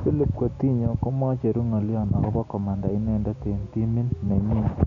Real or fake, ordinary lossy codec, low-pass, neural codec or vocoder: real; none; 7.2 kHz; none